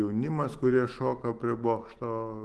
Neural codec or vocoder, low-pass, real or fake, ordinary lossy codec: autoencoder, 48 kHz, 128 numbers a frame, DAC-VAE, trained on Japanese speech; 10.8 kHz; fake; Opus, 16 kbps